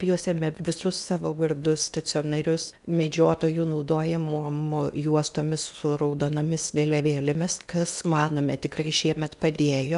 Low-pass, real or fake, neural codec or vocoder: 10.8 kHz; fake; codec, 16 kHz in and 24 kHz out, 0.8 kbps, FocalCodec, streaming, 65536 codes